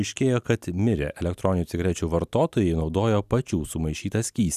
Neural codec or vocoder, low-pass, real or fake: none; 14.4 kHz; real